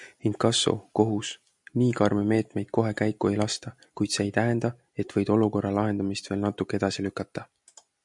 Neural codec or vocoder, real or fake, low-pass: none; real; 10.8 kHz